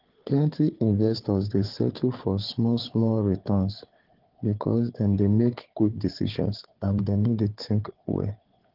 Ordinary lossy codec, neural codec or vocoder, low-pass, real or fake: Opus, 16 kbps; codec, 16 kHz, 4 kbps, FunCodec, trained on Chinese and English, 50 frames a second; 5.4 kHz; fake